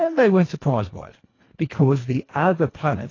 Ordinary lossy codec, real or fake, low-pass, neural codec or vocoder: AAC, 32 kbps; fake; 7.2 kHz; codec, 24 kHz, 1.5 kbps, HILCodec